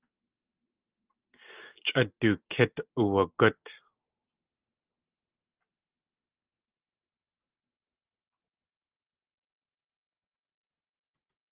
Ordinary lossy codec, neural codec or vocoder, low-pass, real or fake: Opus, 24 kbps; none; 3.6 kHz; real